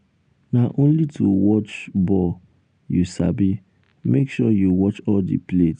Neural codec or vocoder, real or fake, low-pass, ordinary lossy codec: none; real; 9.9 kHz; none